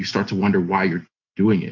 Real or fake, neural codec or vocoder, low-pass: real; none; 7.2 kHz